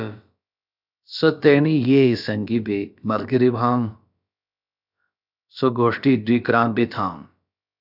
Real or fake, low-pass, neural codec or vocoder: fake; 5.4 kHz; codec, 16 kHz, about 1 kbps, DyCAST, with the encoder's durations